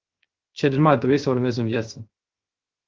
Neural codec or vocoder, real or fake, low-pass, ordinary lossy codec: codec, 16 kHz, 0.3 kbps, FocalCodec; fake; 7.2 kHz; Opus, 16 kbps